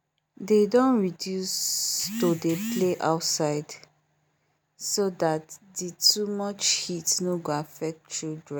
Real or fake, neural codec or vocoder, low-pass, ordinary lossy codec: real; none; none; none